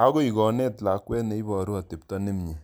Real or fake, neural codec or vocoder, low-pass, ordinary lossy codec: real; none; none; none